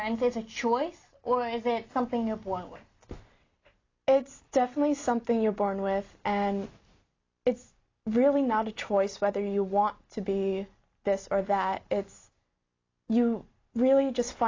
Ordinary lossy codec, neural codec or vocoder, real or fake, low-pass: AAC, 32 kbps; none; real; 7.2 kHz